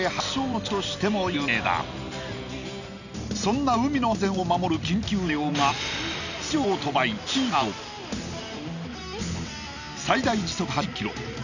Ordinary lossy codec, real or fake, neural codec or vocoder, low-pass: none; real; none; 7.2 kHz